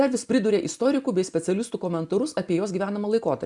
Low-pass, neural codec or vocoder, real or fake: 10.8 kHz; none; real